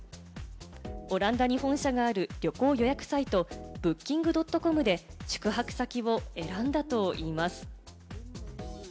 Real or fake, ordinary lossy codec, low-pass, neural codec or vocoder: real; none; none; none